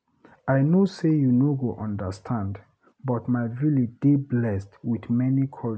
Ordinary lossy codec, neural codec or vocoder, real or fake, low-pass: none; none; real; none